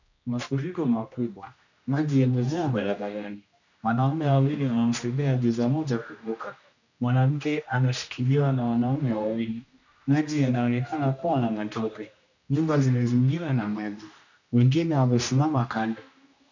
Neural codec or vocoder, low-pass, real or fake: codec, 16 kHz, 1 kbps, X-Codec, HuBERT features, trained on general audio; 7.2 kHz; fake